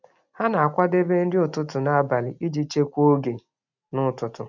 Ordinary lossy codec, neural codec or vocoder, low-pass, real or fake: none; none; 7.2 kHz; real